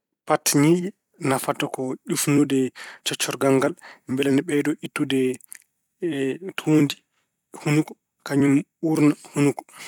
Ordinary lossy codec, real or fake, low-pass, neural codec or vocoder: none; fake; 19.8 kHz; vocoder, 44.1 kHz, 128 mel bands every 256 samples, BigVGAN v2